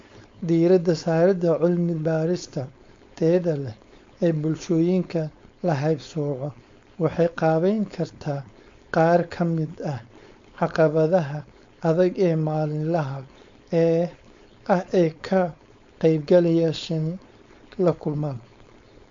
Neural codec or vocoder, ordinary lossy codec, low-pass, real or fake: codec, 16 kHz, 4.8 kbps, FACodec; MP3, 48 kbps; 7.2 kHz; fake